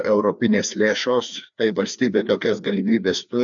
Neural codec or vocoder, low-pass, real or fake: codec, 16 kHz, 2 kbps, FreqCodec, larger model; 7.2 kHz; fake